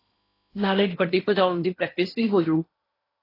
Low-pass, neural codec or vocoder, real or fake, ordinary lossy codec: 5.4 kHz; codec, 16 kHz in and 24 kHz out, 0.8 kbps, FocalCodec, streaming, 65536 codes; fake; AAC, 24 kbps